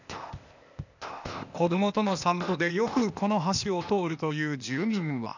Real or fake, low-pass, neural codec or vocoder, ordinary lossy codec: fake; 7.2 kHz; codec, 16 kHz, 0.8 kbps, ZipCodec; none